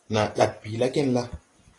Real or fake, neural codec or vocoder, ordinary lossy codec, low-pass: real; none; AAC, 32 kbps; 10.8 kHz